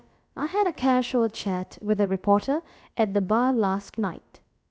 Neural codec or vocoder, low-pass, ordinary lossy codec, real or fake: codec, 16 kHz, about 1 kbps, DyCAST, with the encoder's durations; none; none; fake